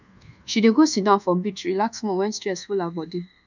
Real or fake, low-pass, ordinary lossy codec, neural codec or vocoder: fake; 7.2 kHz; none; codec, 24 kHz, 1.2 kbps, DualCodec